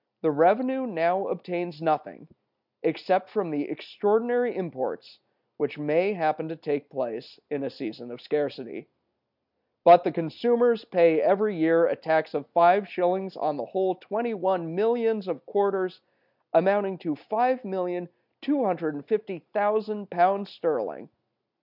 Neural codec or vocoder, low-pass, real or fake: none; 5.4 kHz; real